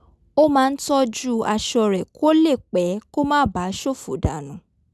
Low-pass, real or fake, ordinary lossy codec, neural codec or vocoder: none; real; none; none